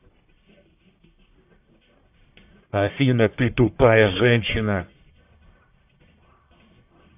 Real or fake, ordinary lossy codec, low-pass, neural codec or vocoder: fake; AAC, 32 kbps; 3.6 kHz; codec, 44.1 kHz, 1.7 kbps, Pupu-Codec